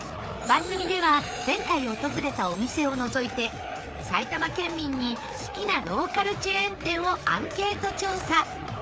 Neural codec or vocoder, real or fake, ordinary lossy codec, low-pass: codec, 16 kHz, 4 kbps, FreqCodec, larger model; fake; none; none